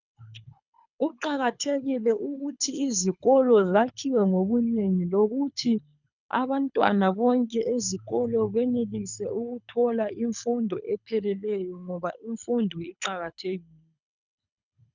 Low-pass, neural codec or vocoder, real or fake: 7.2 kHz; codec, 24 kHz, 6 kbps, HILCodec; fake